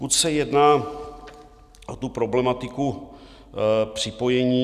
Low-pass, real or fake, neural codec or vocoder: 14.4 kHz; real; none